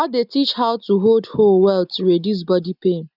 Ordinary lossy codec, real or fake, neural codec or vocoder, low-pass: none; real; none; 5.4 kHz